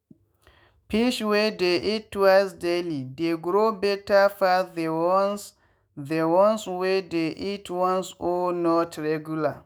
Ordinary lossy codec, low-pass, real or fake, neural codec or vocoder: none; none; fake; autoencoder, 48 kHz, 128 numbers a frame, DAC-VAE, trained on Japanese speech